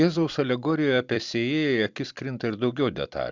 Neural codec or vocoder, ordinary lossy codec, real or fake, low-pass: vocoder, 44.1 kHz, 128 mel bands every 256 samples, BigVGAN v2; Opus, 64 kbps; fake; 7.2 kHz